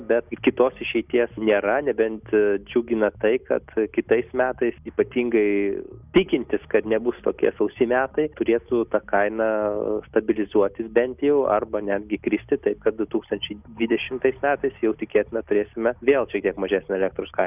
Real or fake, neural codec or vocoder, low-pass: real; none; 3.6 kHz